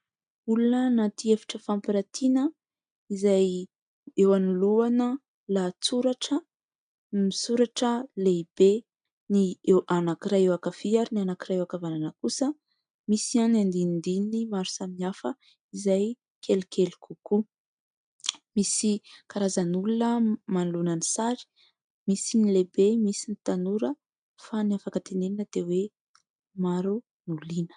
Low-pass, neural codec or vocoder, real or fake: 9.9 kHz; none; real